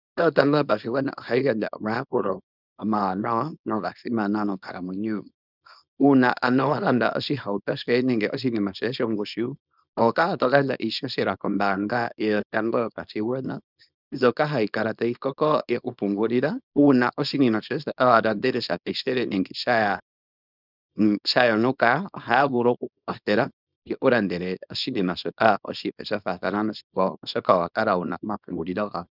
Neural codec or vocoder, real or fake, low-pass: codec, 24 kHz, 0.9 kbps, WavTokenizer, small release; fake; 5.4 kHz